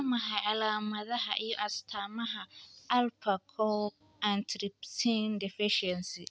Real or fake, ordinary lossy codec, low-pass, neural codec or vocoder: real; none; none; none